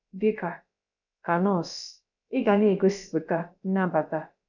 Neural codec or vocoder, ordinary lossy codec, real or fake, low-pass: codec, 16 kHz, about 1 kbps, DyCAST, with the encoder's durations; none; fake; 7.2 kHz